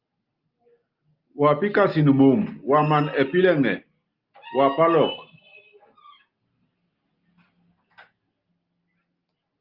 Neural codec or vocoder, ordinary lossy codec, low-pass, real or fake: none; Opus, 32 kbps; 5.4 kHz; real